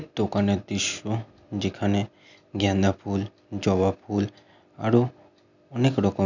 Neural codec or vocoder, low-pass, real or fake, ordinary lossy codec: none; 7.2 kHz; real; none